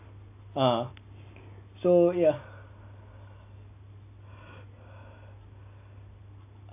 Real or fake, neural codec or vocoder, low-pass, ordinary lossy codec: real; none; 3.6 kHz; AAC, 24 kbps